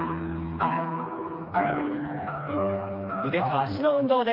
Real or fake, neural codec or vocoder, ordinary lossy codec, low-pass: fake; codec, 16 kHz, 2 kbps, FreqCodec, smaller model; AAC, 32 kbps; 5.4 kHz